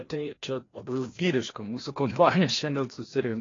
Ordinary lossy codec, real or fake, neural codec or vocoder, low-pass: AAC, 32 kbps; fake; codec, 16 kHz, 1 kbps, FreqCodec, larger model; 7.2 kHz